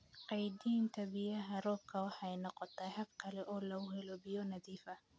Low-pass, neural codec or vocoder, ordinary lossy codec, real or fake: none; none; none; real